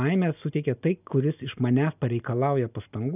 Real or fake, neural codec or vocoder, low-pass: real; none; 3.6 kHz